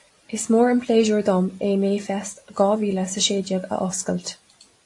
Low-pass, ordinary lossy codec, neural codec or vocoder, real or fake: 10.8 kHz; AAC, 48 kbps; none; real